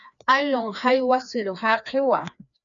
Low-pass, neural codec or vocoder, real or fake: 7.2 kHz; codec, 16 kHz, 2 kbps, FreqCodec, larger model; fake